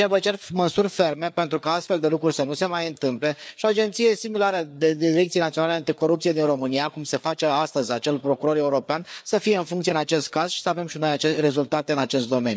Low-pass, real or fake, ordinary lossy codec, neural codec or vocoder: none; fake; none; codec, 16 kHz, 4 kbps, FreqCodec, larger model